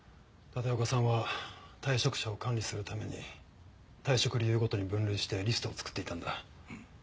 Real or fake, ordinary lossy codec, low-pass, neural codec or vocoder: real; none; none; none